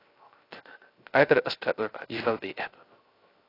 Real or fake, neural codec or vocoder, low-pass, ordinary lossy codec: fake; codec, 16 kHz, 0.3 kbps, FocalCodec; 5.4 kHz; AAC, 24 kbps